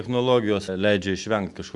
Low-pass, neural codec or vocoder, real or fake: 10.8 kHz; none; real